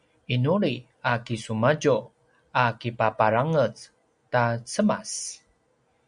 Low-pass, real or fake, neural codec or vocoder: 9.9 kHz; real; none